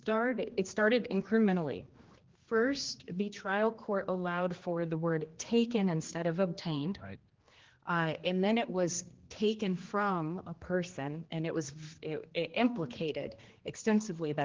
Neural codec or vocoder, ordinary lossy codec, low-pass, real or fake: codec, 16 kHz, 2 kbps, X-Codec, HuBERT features, trained on general audio; Opus, 16 kbps; 7.2 kHz; fake